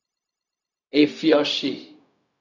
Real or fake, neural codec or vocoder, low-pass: fake; codec, 16 kHz, 0.4 kbps, LongCat-Audio-Codec; 7.2 kHz